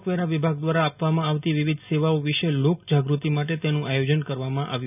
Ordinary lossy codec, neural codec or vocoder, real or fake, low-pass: none; none; real; 3.6 kHz